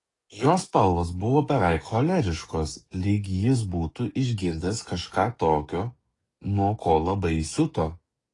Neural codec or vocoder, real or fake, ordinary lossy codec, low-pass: codec, 44.1 kHz, 7.8 kbps, DAC; fake; AAC, 32 kbps; 10.8 kHz